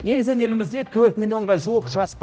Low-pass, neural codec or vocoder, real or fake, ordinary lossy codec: none; codec, 16 kHz, 0.5 kbps, X-Codec, HuBERT features, trained on general audio; fake; none